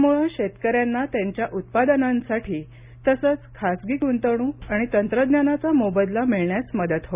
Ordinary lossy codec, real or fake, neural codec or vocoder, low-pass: Opus, 64 kbps; real; none; 3.6 kHz